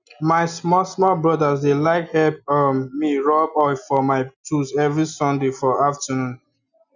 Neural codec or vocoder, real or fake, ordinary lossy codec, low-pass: none; real; none; 7.2 kHz